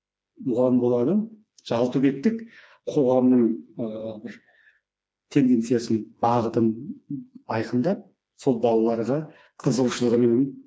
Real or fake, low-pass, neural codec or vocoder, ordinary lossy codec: fake; none; codec, 16 kHz, 2 kbps, FreqCodec, smaller model; none